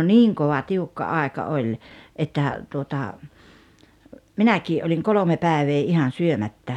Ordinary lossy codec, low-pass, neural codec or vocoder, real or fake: none; 19.8 kHz; none; real